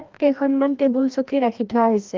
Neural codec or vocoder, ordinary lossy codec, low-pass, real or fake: codec, 16 kHz, 1 kbps, FreqCodec, larger model; Opus, 32 kbps; 7.2 kHz; fake